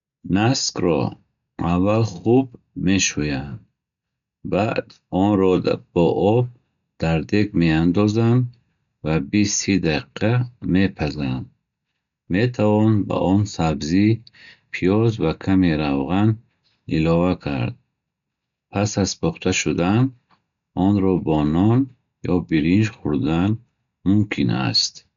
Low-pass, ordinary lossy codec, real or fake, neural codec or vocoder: 7.2 kHz; none; real; none